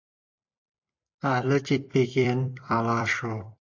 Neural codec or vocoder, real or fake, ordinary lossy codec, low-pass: vocoder, 44.1 kHz, 128 mel bands every 256 samples, BigVGAN v2; fake; AAC, 48 kbps; 7.2 kHz